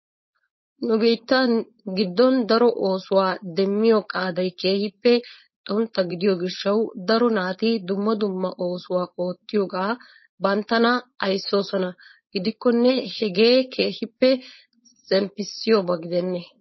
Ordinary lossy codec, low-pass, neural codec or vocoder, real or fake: MP3, 24 kbps; 7.2 kHz; codec, 16 kHz, 4.8 kbps, FACodec; fake